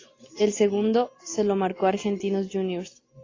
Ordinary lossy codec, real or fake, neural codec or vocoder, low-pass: AAC, 32 kbps; real; none; 7.2 kHz